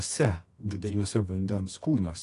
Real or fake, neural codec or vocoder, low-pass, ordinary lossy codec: fake; codec, 24 kHz, 0.9 kbps, WavTokenizer, medium music audio release; 10.8 kHz; AAC, 48 kbps